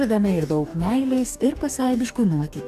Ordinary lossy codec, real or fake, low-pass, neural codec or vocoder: AAC, 96 kbps; fake; 14.4 kHz; codec, 44.1 kHz, 2.6 kbps, DAC